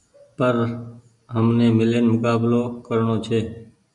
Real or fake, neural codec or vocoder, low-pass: real; none; 10.8 kHz